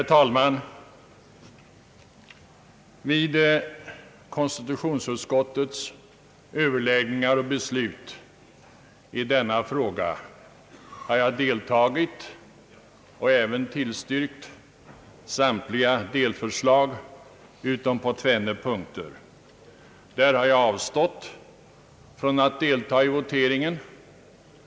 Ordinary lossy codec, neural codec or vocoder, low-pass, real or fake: none; none; none; real